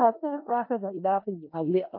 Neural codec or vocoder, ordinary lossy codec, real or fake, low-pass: codec, 16 kHz in and 24 kHz out, 0.4 kbps, LongCat-Audio-Codec, four codebook decoder; MP3, 32 kbps; fake; 5.4 kHz